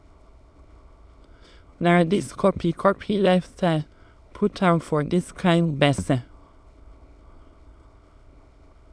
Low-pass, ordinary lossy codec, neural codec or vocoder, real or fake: none; none; autoencoder, 22.05 kHz, a latent of 192 numbers a frame, VITS, trained on many speakers; fake